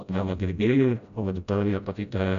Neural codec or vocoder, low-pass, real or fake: codec, 16 kHz, 0.5 kbps, FreqCodec, smaller model; 7.2 kHz; fake